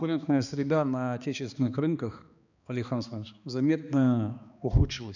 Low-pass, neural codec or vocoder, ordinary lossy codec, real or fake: 7.2 kHz; codec, 16 kHz, 2 kbps, X-Codec, HuBERT features, trained on balanced general audio; none; fake